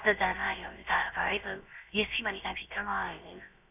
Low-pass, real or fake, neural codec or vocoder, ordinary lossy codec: 3.6 kHz; fake; codec, 16 kHz, about 1 kbps, DyCAST, with the encoder's durations; none